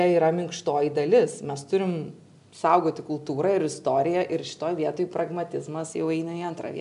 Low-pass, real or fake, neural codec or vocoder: 10.8 kHz; real; none